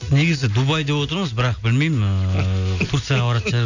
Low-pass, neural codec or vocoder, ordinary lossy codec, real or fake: 7.2 kHz; none; none; real